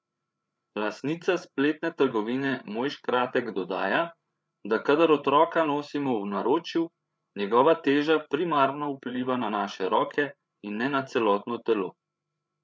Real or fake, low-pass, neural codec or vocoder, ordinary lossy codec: fake; none; codec, 16 kHz, 8 kbps, FreqCodec, larger model; none